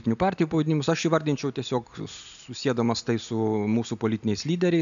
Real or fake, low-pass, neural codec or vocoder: real; 7.2 kHz; none